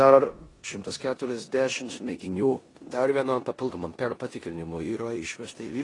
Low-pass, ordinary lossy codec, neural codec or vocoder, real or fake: 10.8 kHz; AAC, 32 kbps; codec, 16 kHz in and 24 kHz out, 0.9 kbps, LongCat-Audio-Codec, four codebook decoder; fake